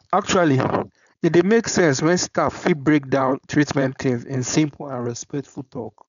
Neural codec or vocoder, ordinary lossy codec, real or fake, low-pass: codec, 16 kHz, 4.8 kbps, FACodec; none; fake; 7.2 kHz